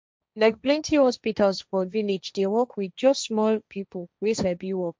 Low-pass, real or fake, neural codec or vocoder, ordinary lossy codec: none; fake; codec, 16 kHz, 1.1 kbps, Voila-Tokenizer; none